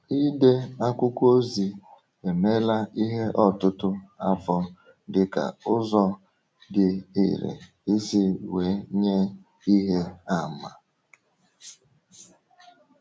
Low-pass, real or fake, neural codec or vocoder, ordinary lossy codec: none; real; none; none